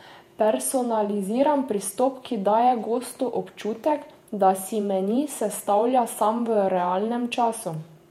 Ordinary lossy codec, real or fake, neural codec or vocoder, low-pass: MP3, 64 kbps; fake; vocoder, 48 kHz, 128 mel bands, Vocos; 19.8 kHz